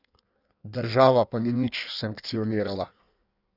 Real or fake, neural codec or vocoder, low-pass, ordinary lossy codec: fake; codec, 16 kHz in and 24 kHz out, 1.1 kbps, FireRedTTS-2 codec; 5.4 kHz; none